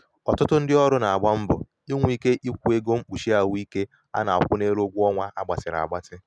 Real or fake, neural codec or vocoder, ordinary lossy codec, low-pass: real; none; none; none